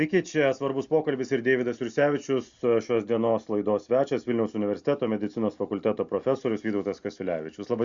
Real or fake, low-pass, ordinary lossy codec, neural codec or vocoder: real; 7.2 kHz; Opus, 64 kbps; none